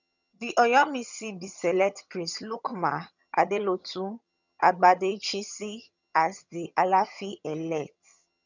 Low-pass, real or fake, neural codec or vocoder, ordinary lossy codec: 7.2 kHz; fake; vocoder, 22.05 kHz, 80 mel bands, HiFi-GAN; none